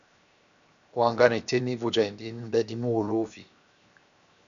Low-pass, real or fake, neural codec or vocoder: 7.2 kHz; fake; codec, 16 kHz, 0.7 kbps, FocalCodec